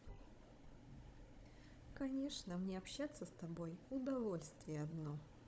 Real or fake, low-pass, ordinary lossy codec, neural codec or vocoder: fake; none; none; codec, 16 kHz, 16 kbps, FunCodec, trained on Chinese and English, 50 frames a second